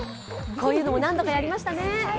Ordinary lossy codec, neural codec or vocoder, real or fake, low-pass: none; none; real; none